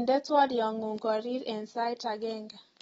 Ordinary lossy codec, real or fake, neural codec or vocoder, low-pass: AAC, 24 kbps; real; none; 10.8 kHz